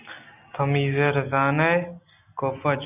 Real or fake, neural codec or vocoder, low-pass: real; none; 3.6 kHz